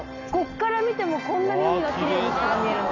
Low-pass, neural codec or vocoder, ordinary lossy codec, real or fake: 7.2 kHz; none; none; real